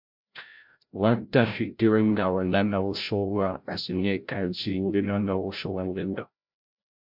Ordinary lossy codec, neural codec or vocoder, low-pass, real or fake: MP3, 48 kbps; codec, 16 kHz, 0.5 kbps, FreqCodec, larger model; 5.4 kHz; fake